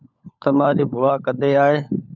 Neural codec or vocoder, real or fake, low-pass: codec, 16 kHz, 16 kbps, FunCodec, trained on LibriTTS, 50 frames a second; fake; 7.2 kHz